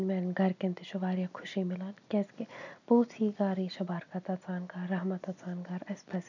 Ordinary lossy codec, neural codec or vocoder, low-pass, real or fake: none; none; 7.2 kHz; real